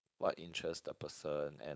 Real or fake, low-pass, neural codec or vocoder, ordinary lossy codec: fake; none; codec, 16 kHz, 4.8 kbps, FACodec; none